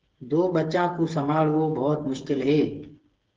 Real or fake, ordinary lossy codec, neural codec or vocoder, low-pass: fake; Opus, 16 kbps; codec, 16 kHz, 8 kbps, FreqCodec, smaller model; 7.2 kHz